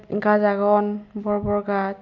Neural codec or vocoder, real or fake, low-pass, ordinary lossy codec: none; real; 7.2 kHz; none